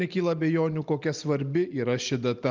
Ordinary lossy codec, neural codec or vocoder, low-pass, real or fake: Opus, 24 kbps; none; 7.2 kHz; real